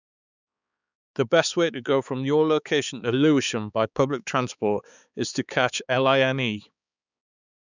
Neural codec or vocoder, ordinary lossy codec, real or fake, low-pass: codec, 16 kHz, 4 kbps, X-Codec, HuBERT features, trained on balanced general audio; none; fake; 7.2 kHz